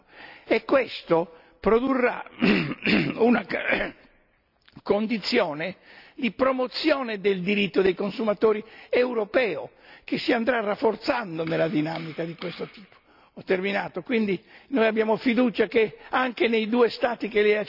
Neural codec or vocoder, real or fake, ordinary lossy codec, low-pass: none; real; none; 5.4 kHz